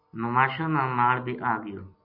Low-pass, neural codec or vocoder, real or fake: 5.4 kHz; none; real